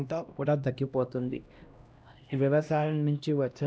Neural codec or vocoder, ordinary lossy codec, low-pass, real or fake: codec, 16 kHz, 1 kbps, X-Codec, HuBERT features, trained on LibriSpeech; none; none; fake